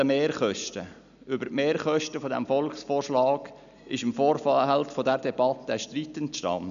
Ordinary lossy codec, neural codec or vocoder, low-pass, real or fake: none; none; 7.2 kHz; real